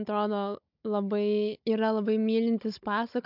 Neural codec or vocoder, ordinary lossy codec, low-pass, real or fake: codec, 16 kHz, 16 kbps, FunCodec, trained on Chinese and English, 50 frames a second; MP3, 48 kbps; 5.4 kHz; fake